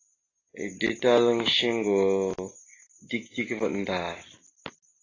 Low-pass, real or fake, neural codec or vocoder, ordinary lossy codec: 7.2 kHz; real; none; AAC, 32 kbps